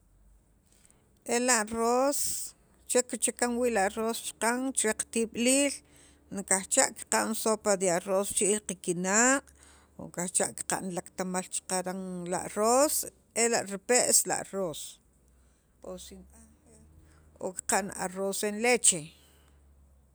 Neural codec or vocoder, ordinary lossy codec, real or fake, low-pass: none; none; real; none